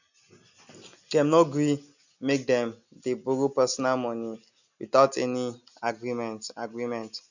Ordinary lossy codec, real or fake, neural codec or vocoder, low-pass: none; real; none; 7.2 kHz